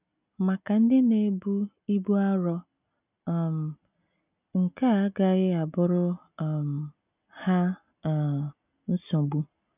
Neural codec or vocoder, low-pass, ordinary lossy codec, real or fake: none; 3.6 kHz; none; real